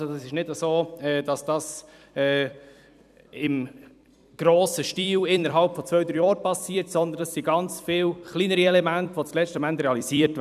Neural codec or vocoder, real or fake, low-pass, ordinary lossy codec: vocoder, 44.1 kHz, 128 mel bands every 256 samples, BigVGAN v2; fake; 14.4 kHz; none